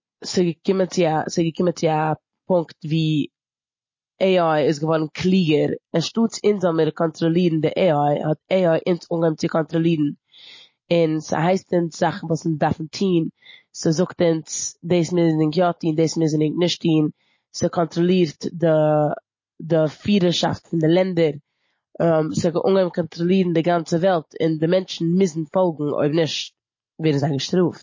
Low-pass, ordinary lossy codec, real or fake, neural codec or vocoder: 7.2 kHz; MP3, 32 kbps; real; none